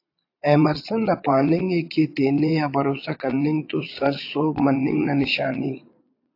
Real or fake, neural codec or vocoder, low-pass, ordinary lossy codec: fake; vocoder, 22.05 kHz, 80 mel bands, Vocos; 5.4 kHz; AAC, 32 kbps